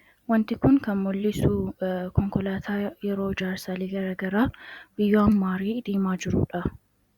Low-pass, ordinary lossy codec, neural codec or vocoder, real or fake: 19.8 kHz; Opus, 64 kbps; vocoder, 44.1 kHz, 128 mel bands every 256 samples, BigVGAN v2; fake